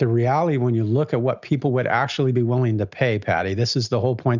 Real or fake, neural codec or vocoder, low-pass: real; none; 7.2 kHz